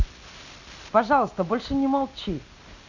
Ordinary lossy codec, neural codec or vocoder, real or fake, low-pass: none; none; real; 7.2 kHz